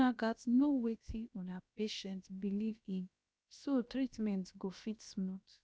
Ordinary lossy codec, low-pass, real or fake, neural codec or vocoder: none; none; fake; codec, 16 kHz, about 1 kbps, DyCAST, with the encoder's durations